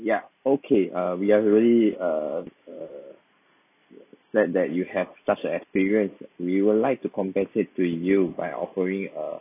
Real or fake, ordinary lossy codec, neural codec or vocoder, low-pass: real; none; none; 3.6 kHz